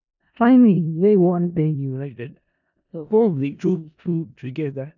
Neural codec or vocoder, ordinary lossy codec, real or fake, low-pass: codec, 16 kHz in and 24 kHz out, 0.4 kbps, LongCat-Audio-Codec, four codebook decoder; none; fake; 7.2 kHz